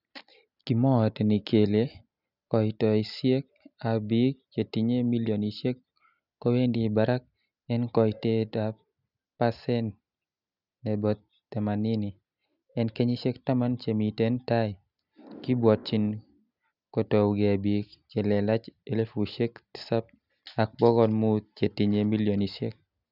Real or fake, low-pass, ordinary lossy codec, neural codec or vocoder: real; 5.4 kHz; none; none